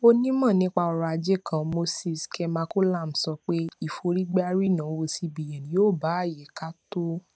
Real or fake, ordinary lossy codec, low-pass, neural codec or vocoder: real; none; none; none